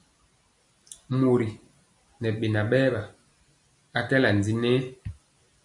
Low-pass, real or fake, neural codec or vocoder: 10.8 kHz; real; none